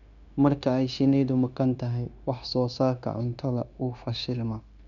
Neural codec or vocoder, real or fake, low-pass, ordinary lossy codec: codec, 16 kHz, 0.9 kbps, LongCat-Audio-Codec; fake; 7.2 kHz; none